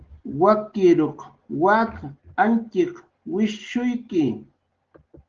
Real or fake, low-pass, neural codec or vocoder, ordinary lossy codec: real; 7.2 kHz; none; Opus, 16 kbps